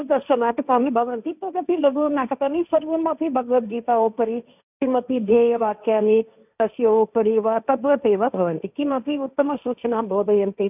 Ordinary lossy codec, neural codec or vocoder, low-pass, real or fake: none; codec, 16 kHz, 1.1 kbps, Voila-Tokenizer; 3.6 kHz; fake